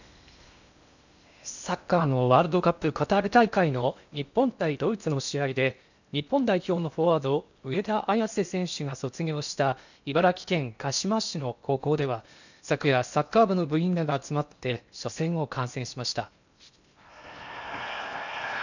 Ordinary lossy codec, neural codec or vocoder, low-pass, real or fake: none; codec, 16 kHz in and 24 kHz out, 0.8 kbps, FocalCodec, streaming, 65536 codes; 7.2 kHz; fake